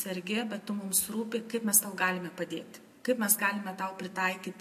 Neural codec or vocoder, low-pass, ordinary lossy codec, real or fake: none; 14.4 kHz; MP3, 64 kbps; real